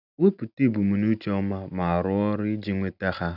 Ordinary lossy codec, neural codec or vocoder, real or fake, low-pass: none; none; real; 5.4 kHz